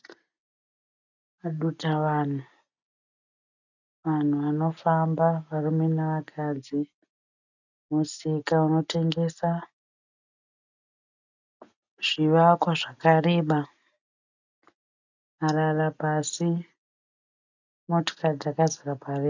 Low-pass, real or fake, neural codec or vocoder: 7.2 kHz; real; none